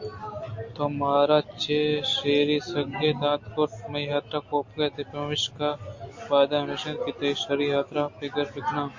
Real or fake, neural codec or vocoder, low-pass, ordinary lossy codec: real; none; 7.2 kHz; MP3, 48 kbps